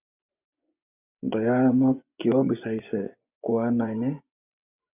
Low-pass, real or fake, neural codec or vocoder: 3.6 kHz; fake; vocoder, 24 kHz, 100 mel bands, Vocos